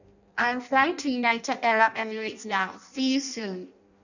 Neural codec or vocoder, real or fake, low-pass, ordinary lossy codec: codec, 16 kHz in and 24 kHz out, 0.6 kbps, FireRedTTS-2 codec; fake; 7.2 kHz; none